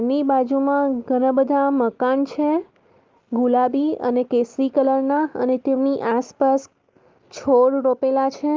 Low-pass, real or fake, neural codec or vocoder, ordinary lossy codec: 7.2 kHz; real; none; Opus, 32 kbps